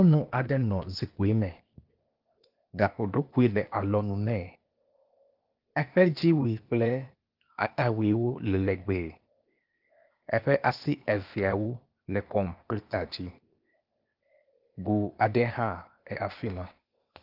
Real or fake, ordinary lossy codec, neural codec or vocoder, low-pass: fake; Opus, 24 kbps; codec, 16 kHz, 0.8 kbps, ZipCodec; 5.4 kHz